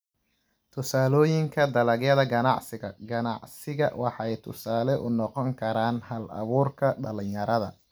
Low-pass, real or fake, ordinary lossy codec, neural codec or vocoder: none; real; none; none